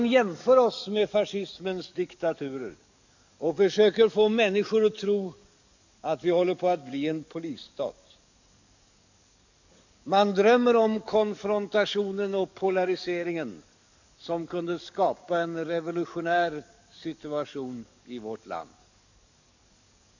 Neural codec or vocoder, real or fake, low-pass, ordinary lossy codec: codec, 44.1 kHz, 7.8 kbps, DAC; fake; 7.2 kHz; none